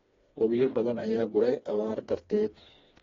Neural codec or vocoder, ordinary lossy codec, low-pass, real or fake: codec, 16 kHz, 2 kbps, FreqCodec, smaller model; AAC, 24 kbps; 7.2 kHz; fake